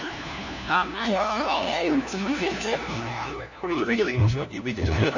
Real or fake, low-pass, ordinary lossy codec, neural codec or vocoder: fake; 7.2 kHz; none; codec, 16 kHz, 1 kbps, FunCodec, trained on LibriTTS, 50 frames a second